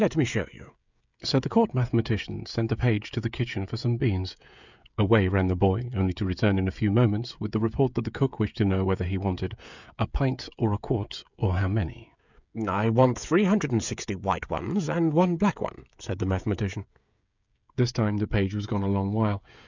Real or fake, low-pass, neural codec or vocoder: fake; 7.2 kHz; codec, 16 kHz, 16 kbps, FreqCodec, smaller model